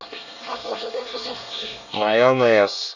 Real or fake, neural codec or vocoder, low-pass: fake; codec, 24 kHz, 1 kbps, SNAC; 7.2 kHz